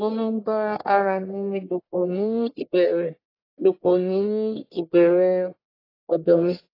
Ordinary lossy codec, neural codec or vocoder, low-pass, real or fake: AAC, 24 kbps; codec, 44.1 kHz, 1.7 kbps, Pupu-Codec; 5.4 kHz; fake